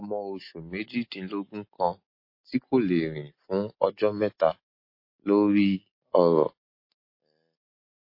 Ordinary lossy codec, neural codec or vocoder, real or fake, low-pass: MP3, 32 kbps; none; real; 5.4 kHz